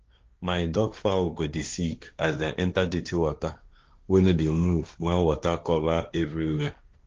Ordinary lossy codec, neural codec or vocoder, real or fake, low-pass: Opus, 24 kbps; codec, 16 kHz, 1.1 kbps, Voila-Tokenizer; fake; 7.2 kHz